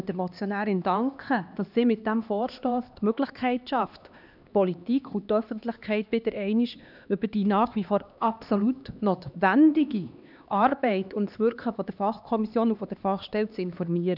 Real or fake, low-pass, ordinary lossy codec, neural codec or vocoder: fake; 5.4 kHz; none; codec, 16 kHz, 2 kbps, X-Codec, HuBERT features, trained on LibriSpeech